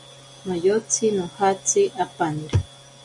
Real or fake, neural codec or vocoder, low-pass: real; none; 10.8 kHz